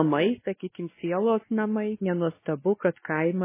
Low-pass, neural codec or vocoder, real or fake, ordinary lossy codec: 3.6 kHz; codec, 16 kHz, 2 kbps, X-Codec, WavLM features, trained on Multilingual LibriSpeech; fake; MP3, 16 kbps